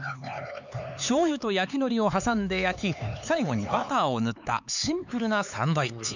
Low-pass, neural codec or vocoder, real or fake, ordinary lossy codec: 7.2 kHz; codec, 16 kHz, 4 kbps, X-Codec, HuBERT features, trained on LibriSpeech; fake; none